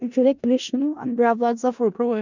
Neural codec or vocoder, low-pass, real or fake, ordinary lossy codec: codec, 16 kHz in and 24 kHz out, 0.4 kbps, LongCat-Audio-Codec, four codebook decoder; 7.2 kHz; fake; none